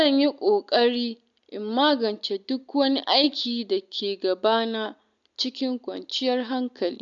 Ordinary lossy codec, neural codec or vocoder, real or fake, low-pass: none; none; real; 7.2 kHz